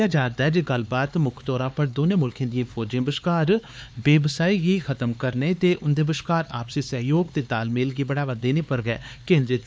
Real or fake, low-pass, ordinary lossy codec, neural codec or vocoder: fake; none; none; codec, 16 kHz, 4 kbps, X-Codec, HuBERT features, trained on LibriSpeech